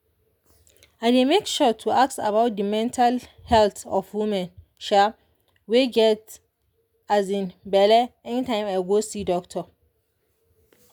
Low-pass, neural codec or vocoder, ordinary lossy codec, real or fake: none; none; none; real